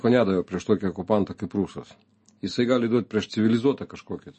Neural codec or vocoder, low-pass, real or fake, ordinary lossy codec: none; 10.8 kHz; real; MP3, 32 kbps